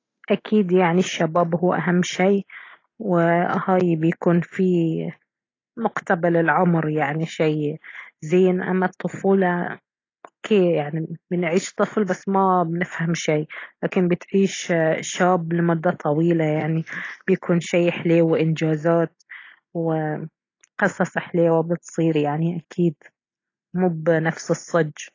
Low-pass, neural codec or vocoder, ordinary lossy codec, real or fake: 7.2 kHz; none; AAC, 32 kbps; real